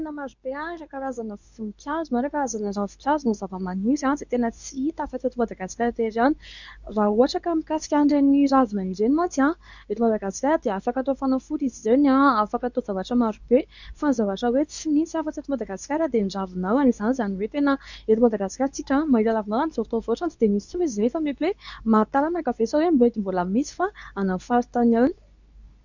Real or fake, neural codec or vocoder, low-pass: fake; codec, 24 kHz, 0.9 kbps, WavTokenizer, medium speech release version 2; 7.2 kHz